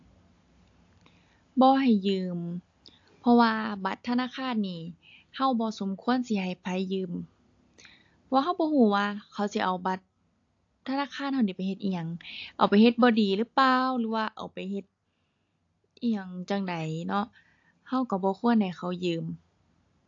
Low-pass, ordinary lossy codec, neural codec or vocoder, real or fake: 7.2 kHz; AAC, 48 kbps; none; real